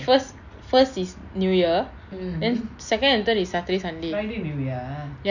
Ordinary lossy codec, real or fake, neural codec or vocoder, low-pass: none; real; none; 7.2 kHz